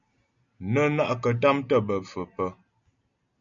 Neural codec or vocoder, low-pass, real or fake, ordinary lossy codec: none; 7.2 kHz; real; MP3, 96 kbps